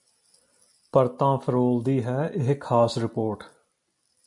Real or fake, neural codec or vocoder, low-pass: real; none; 10.8 kHz